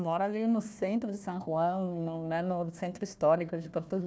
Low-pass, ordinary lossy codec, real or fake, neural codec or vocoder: none; none; fake; codec, 16 kHz, 1 kbps, FunCodec, trained on Chinese and English, 50 frames a second